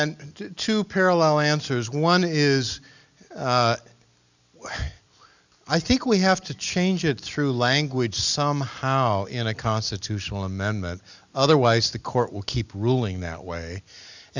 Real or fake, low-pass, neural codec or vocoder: real; 7.2 kHz; none